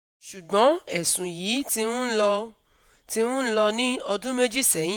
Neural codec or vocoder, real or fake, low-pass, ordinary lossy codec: vocoder, 48 kHz, 128 mel bands, Vocos; fake; none; none